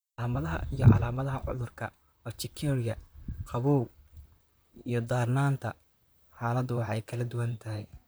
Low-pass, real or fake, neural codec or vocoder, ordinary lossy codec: none; fake; vocoder, 44.1 kHz, 128 mel bands, Pupu-Vocoder; none